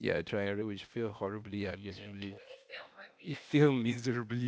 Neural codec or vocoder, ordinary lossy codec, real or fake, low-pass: codec, 16 kHz, 0.8 kbps, ZipCodec; none; fake; none